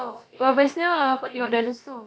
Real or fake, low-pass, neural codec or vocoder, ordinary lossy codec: fake; none; codec, 16 kHz, about 1 kbps, DyCAST, with the encoder's durations; none